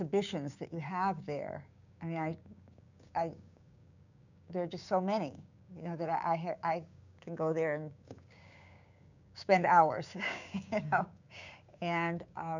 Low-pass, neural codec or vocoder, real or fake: 7.2 kHz; codec, 16 kHz, 6 kbps, DAC; fake